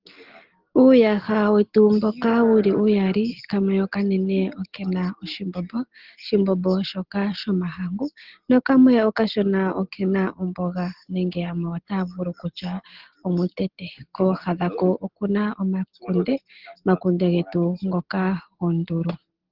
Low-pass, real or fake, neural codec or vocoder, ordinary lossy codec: 5.4 kHz; real; none; Opus, 16 kbps